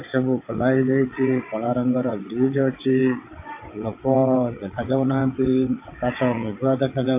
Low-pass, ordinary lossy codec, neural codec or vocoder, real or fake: 3.6 kHz; MP3, 24 kbps; vocoder, 22.05 kHz, 80 mel bands, WaveNeXt; fake